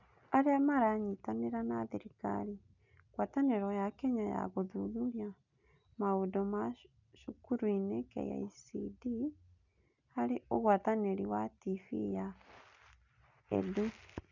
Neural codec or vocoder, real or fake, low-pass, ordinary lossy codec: none; real; 7.2 kHz; none